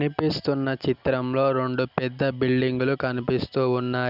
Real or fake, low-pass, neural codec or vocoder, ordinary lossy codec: real; 5.4 kHz; none; none